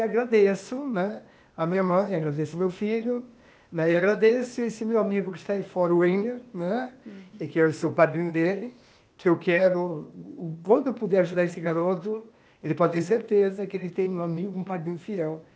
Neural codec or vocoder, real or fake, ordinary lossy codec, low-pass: codec, 16 kHz, 0.8 kbps, ZipCodec; fake; none; none